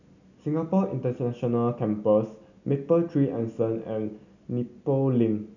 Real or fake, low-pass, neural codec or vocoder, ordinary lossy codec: real; 7.2 kHz; none; none